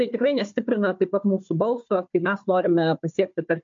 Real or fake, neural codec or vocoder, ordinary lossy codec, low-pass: fake; codec, 16 kHz, 4 kbps, FunCodec, trained on Chinese and English, 50 frames a second; MP3, 48 kbps; 7.2 kHz